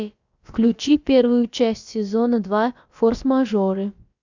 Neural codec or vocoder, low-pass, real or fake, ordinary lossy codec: codec, 16 kHz, about 1 kbps, DyCAST, with the encoder's durations; 7.2 kHz; fake; Opus, 64 kbps